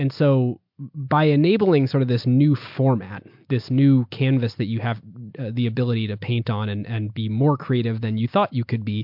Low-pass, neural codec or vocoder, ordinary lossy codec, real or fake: 5.4 kHz; none; MP3, 48 kbps; real